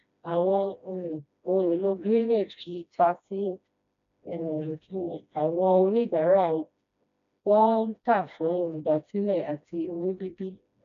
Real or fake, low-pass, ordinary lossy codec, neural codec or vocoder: fake; 7.2 kHz; none; codec, 16 kHz, 1 kbps, FreqCodec, smaller model